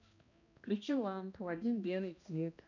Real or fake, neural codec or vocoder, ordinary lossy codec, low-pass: fake; codec, 16 kHz, 1 kbps, X-Codec, HuBERT features, trained on balanced general audio; none; 7.2 kHz